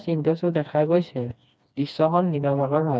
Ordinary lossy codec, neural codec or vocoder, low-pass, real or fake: none; codec, 16 kHz, 2 kbps, FreqCodec, smaller model; none; fake